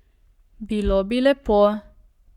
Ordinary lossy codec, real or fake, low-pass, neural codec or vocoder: none; fake; 19.8 kHz; codec, 44.1 kHz, 7.8 kbps, Pupu-Codec